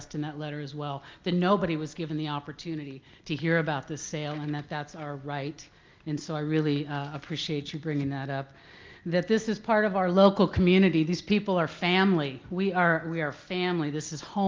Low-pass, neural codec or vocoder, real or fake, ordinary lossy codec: 7.2 kHz; none; real; Opus, 32 kbps